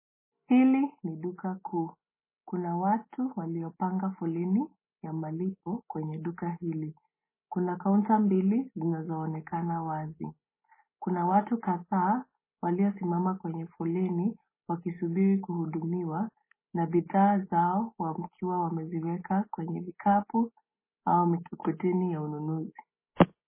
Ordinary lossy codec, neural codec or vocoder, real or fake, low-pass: MP3, 16 kbps; none; real; 3.6 kHz